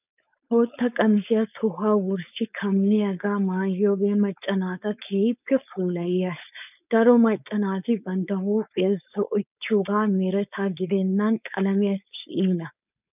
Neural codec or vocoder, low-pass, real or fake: codec, 16 kHz, 4.8 kbps, FACodec; 3.6 kHz; fake